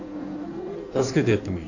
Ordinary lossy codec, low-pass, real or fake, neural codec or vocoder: none; 7.2 kHz; fake; codec, 16 kHz in and 24 kHz out, 1.1 kbps, FireRedTTS-2 codec